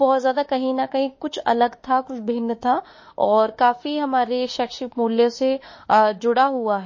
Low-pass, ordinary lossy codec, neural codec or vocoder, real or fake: 7.2 kHz; MP3, 32 kbps; autoencoder, 48 kHz, 32 numbers a frame, DAC-VAE, trained on Japanese speech; fake